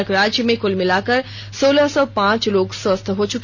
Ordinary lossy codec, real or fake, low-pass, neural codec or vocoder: none; real; 7.2 kHz; none